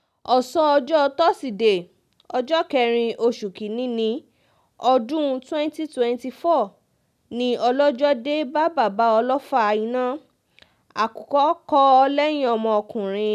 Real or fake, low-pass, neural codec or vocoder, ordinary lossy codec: real; 14.4 kHz; none; none